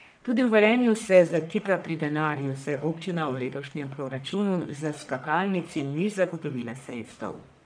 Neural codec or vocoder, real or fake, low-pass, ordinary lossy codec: codec, 44.1 kHz, 1.7 kbps, Pupu-Codec; fake; 9.9 kHz; none